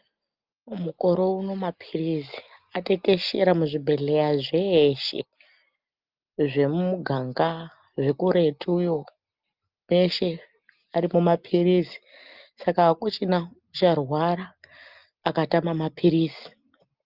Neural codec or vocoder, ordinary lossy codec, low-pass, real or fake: none; Opus, 24 kbps; 5.4 kHz; real